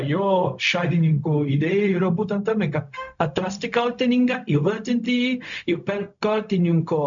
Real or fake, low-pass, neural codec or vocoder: fake; 7.2 kHz; codec, 16 kHz, 0.4 kbps, LongCat-Audio-Codec